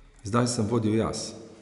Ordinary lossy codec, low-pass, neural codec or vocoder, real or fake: none; 10.8 kHz; none; real